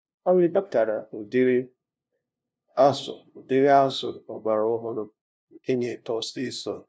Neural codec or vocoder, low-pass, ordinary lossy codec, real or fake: codec, 16 kHz, 0.5 kbps, FunCodec, trained on LibriTTS, 25 frames a second; none; none; fake